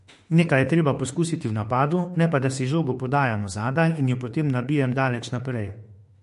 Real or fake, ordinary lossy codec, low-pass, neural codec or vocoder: fake; MP3, 48 kbps; 14.4 kHz; autoencoder, 48 kHz, 32 numbers a frame, DAC-VAE, trained on Japanese speech